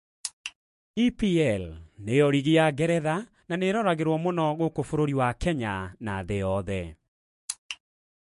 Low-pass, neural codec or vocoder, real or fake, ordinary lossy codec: 14.4 kHz; autoencoder, 48 kHz, 128 numbers a frame, DAC-VAE, trained on Japanese speech; fake; MP3, 48 kbps